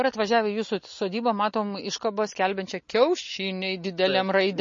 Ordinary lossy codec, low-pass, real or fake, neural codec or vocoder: MP3, 32 kbps; 7.2 kHz; real; none